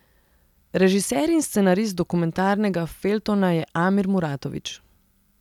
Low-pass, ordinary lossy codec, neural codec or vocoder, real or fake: 19.8 kHz; none; none; real